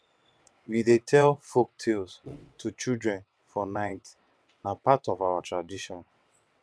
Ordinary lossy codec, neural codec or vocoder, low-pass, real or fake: none; vocoder, 22.05 kHz, 80 mel bands, WaveNeXt; none; fake